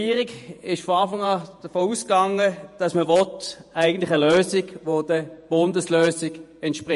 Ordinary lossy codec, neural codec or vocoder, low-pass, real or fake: MP3, 48 kbps; vocoder, 48 kHz, 128 mel bands, Vocos; 14.4 kHz; fake